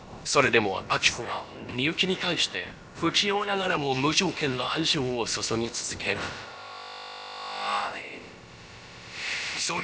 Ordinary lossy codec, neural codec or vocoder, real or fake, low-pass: none; codec, 16 kHz, about 1 kbps, DyCAST, with the encoder's durations; fake; none